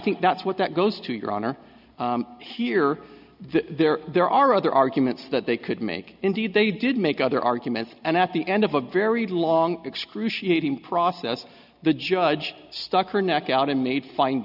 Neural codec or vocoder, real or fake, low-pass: none; real; 5.4 kHz